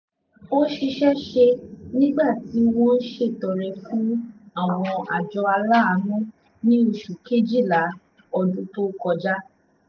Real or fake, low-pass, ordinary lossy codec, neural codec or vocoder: real; 7.2 kHz; none; none